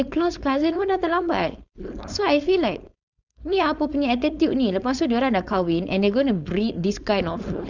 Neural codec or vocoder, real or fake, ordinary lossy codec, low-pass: codec, 16 kHz, 4.8 kbps, FACodec; fake; Opus, 64 kbps; 7.2 kHz